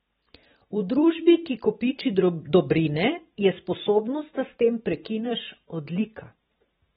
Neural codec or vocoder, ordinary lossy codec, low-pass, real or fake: vocoder, 44.1 kHz, 128 mel bands every 512 samples, BigVGAN v2; AAC, 16 kbps; 19.8 kHz; fake